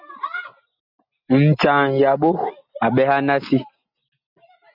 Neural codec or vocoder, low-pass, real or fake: none; 5.4 kHz; real